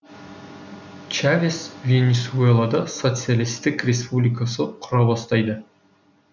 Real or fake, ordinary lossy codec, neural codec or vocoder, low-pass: real; none; none; 7.2 kHz